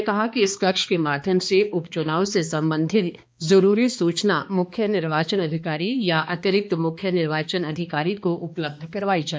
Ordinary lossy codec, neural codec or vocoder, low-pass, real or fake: none; codec, 16 kHz, 2 kbps, X-Codec, HuBERT features, trained on balanced general audio; none; fake